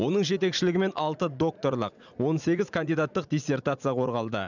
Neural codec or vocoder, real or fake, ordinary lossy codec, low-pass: vocoder, 44.1 kHz, 80 mel bands, Vocos; fake; none; 7.2 kHz